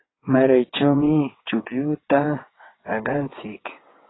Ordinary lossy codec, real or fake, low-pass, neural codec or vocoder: AAC, 16 kbps; fake; 7.2 kHz; vocoder, 22.05 kHz, 80 mel bands, WaveNeXt